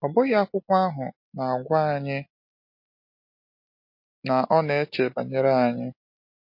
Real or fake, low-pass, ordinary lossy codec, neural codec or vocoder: real; 5.4 kHz; MP3, 32 kbps; none